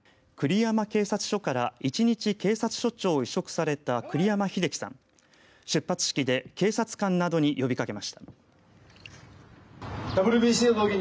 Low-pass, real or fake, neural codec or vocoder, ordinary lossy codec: none; real; none; none